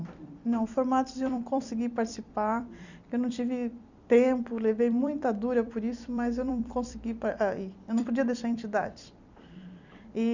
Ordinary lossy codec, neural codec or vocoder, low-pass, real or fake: none; vocoder, 44.1 kHz, 128 mel bands every 256 samples, BigVGAN v2; 7.2 kHz; fake